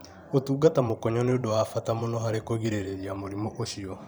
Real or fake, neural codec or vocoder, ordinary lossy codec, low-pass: fake; vocoder, 44.1 kHz, 128 mel bands every 512 samples, BigVGAN v2; none; none